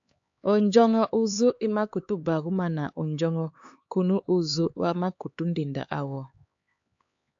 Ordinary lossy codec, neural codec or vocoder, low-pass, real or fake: AAC, 64 kbps; codec, 16 kHz, 4 kbps, X-Codec, HuBERT features, trained on LibriSpeech; 7.2 kHz; fake